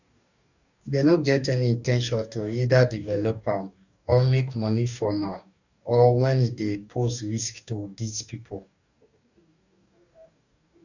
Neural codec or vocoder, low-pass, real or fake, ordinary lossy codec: codec, 44.1 kHz, 2.6 kbps, DAC; 7.2 kHz; fake; none